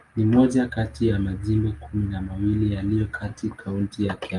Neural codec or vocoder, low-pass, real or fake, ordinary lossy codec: none; 10.8 kHz; real; Opus, 32 kbps